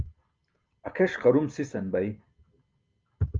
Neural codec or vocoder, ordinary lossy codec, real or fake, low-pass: none; Opus, 24 kbps; real; 7.2 kHz